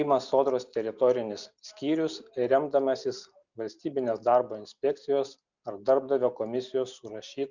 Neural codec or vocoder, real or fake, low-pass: none; real; 7.2 kHz